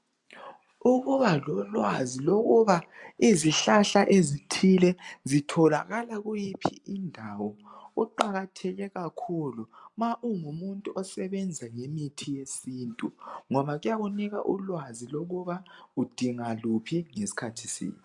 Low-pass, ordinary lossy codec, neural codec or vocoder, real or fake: 10.8 kHz; MP3, 96 kbps; none; real